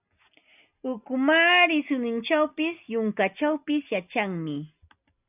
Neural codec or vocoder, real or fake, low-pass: none; real; 3.6 kHz